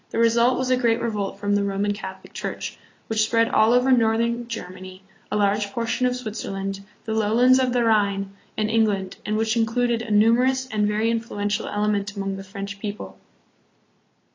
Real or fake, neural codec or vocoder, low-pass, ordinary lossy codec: real; none; 7.2 kHz; AAC, 32 kbps